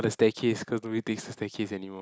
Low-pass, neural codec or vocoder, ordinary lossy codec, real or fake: none; none; none; real